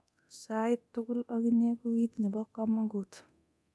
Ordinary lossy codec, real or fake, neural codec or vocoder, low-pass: none; fake; codec, 24 kHz, 0.9 kbps, DualCodec; none